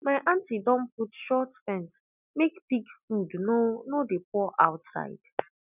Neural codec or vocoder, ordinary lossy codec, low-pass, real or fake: none; none; 3.6 kHz; real